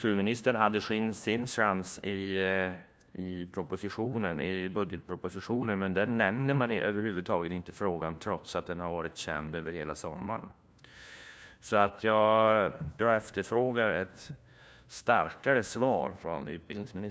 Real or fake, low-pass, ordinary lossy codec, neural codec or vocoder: fake; none; none; codec, 16 kHz, 1 kbps, FunCodec, trained on LibriTTS, 50 frames a second